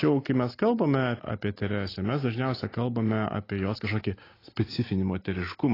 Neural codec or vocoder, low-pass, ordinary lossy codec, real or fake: none; 5.4 kHz; AAC, 24 kbps; real